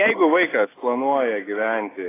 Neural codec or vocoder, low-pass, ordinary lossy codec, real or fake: none; 3.6 kHz; AAC, 16 kbps; real